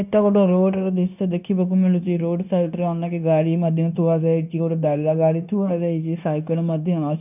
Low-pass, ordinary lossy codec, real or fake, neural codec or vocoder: 3.6 kHz; none; fake; codec, 16 kHz, 0.9 kbps, LongCat-Audio-Codec